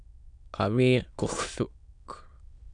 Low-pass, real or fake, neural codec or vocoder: 9.9 kHz; fake; autoencoder, 22.05 kHz, a latent of 192 numbers a frame, VITS, trained on many speakers